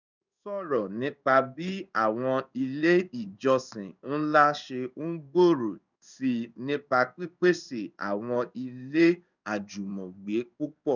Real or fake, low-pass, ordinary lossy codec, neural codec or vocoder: fake; 7.2 kHz; none; codec, 16 kHz in and 24 kHz out, 1 kbps, XY-Tokenizer